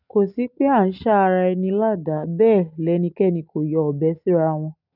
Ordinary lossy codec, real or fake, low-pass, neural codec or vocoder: none; real; 5.4 kHz; none